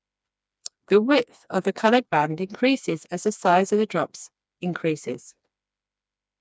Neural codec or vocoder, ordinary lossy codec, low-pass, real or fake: codec, 16 kHz, 2 kbps, FreqCodec, smaller model; none; none; fake